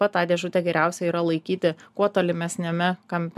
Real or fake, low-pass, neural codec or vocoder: real; 14.4 kHz; none